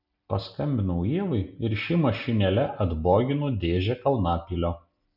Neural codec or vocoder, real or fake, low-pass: none; real; 5.4 kHz